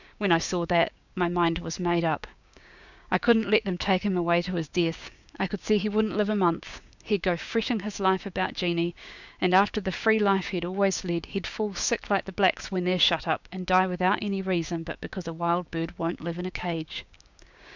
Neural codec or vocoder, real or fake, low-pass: codec, 16 kHz, 6 kbps, DAC; fake; 7.2 kHz